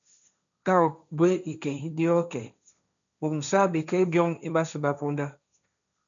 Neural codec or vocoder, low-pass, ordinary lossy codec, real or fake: codec, 16 kHz, 1.1 kbps, Voila-Tokenizer; 7.2 kHz; MP3, 96 kbps; fake